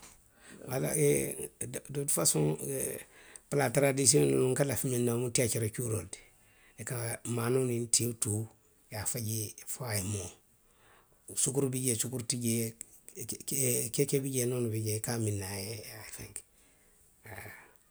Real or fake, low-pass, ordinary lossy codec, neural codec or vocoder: real; none; none; none